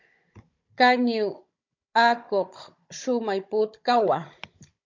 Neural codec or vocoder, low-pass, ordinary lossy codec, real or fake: codec, 16 kHz, 16 kbps, FunCodec, trained on Chinese and English, 50 frames a second; 7.2 kHz; MP3, 48 kbps; fake